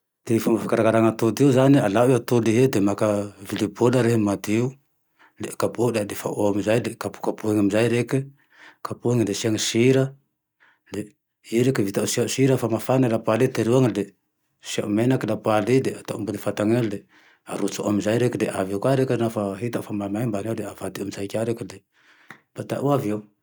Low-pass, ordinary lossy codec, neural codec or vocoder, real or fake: none; none; none; real